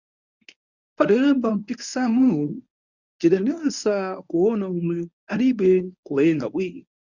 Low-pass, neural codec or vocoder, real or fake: 7.2 kHz; codec, 24 kHz, 0.9 kbps, WavTokenizer, medium speech release version 1; fake